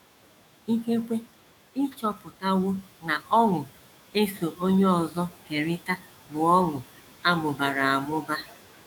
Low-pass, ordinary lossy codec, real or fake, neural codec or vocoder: 19.8 kHz; none; fake; autoencoder, 48 kHz, 128 numbers a frame, DAC-VAE, trained on Japanese speech